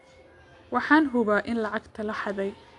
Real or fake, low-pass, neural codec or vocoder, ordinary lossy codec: real; 10.8 kHz; none; AAC, 64 kbps